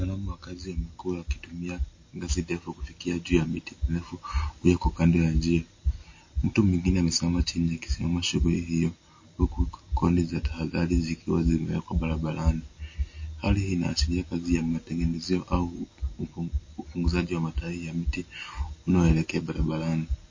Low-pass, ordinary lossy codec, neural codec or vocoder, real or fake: 7.2 kHz; MP3, 32 kbps; none; real